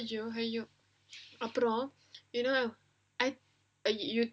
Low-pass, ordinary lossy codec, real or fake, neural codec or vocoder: none; none; real; none